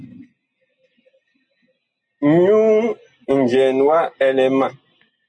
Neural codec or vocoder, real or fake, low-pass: none; real; 9.9 kHz